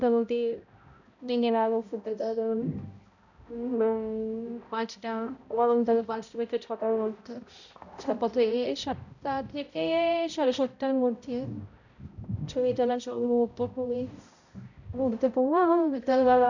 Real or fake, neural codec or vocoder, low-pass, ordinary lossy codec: fake; codec, 16 kHz, 0.5 kbps, X-Codec, HuBERT features, trained on balanced general audio; 7.2 kHz; none